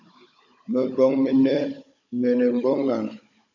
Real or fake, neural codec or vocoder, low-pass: fake; codec, 16 kHz, 16 kbps, FunCodec, trained on Chinese and English, 50 frames a second; 7.2 kHz